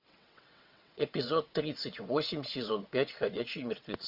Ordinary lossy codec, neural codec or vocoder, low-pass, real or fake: MP3, 48 kbps; vocoder, 22.05 kHz, 80 mel bands, WaveNeXt; 5.4 kHz; fake